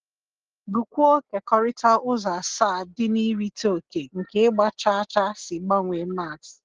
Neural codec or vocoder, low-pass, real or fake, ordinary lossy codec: none; 7.2 kHz; real; Opus, 16 kbps